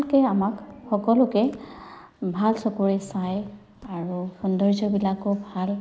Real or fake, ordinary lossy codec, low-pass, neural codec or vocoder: real; none; none; none